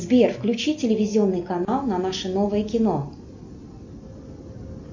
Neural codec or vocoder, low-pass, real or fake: none; 7.2 kHz; real